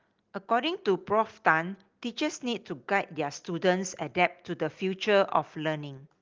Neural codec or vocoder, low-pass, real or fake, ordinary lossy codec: none; 7.2 kHz; real; Opus, 32 kbps